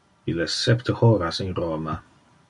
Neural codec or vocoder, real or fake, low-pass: none; real; 10.8 kHz